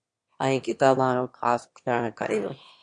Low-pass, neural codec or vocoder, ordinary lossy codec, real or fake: 9.9 kHz; autoencoder, 22.05 kHz, a latent of 192 numbers a frame, VITS, trained on one speaker; MP3, 48 kbps; fake